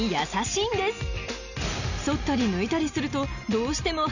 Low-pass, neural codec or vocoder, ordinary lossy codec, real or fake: 7.2 kHz; none; none; real